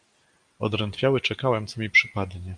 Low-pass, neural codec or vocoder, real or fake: 9.9 kHz; none; real